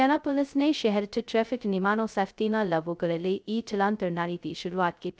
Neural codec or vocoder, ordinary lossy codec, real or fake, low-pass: codec, 16 kHz, 0.2 kbps, FocalCodec; none; fake; none